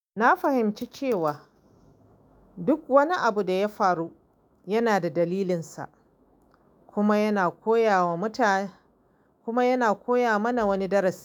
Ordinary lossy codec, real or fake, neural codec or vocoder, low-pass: none; fake; autoencoder, 48 kHz, 128 numbers a frame, DAC-VAE, trained on Japanese speech; none